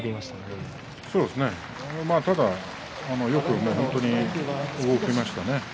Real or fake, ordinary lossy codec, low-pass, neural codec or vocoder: real; none; none; none